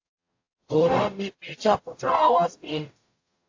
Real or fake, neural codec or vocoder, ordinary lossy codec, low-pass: fake; codec, 44.1 kHz, 0.9 kbps, DAC; AAC, 48 kbps; 7.2 kHz